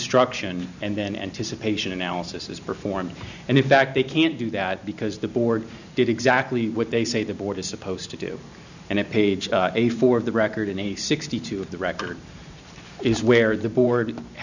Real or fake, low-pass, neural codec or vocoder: fake; 7.2 kHz; vocoder, 44.1 kHz, 128 mel bands every 512 samples, BigVGAN v2